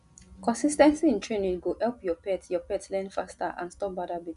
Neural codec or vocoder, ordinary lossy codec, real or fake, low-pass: none; none; real; 10.8 kHz